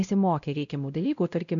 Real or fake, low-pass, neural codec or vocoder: fake; 7.2 kHz; codec, 16 kHz, 0.5 kbps, X-Codec, WavLM features, trained on Multilingual LibriSpeech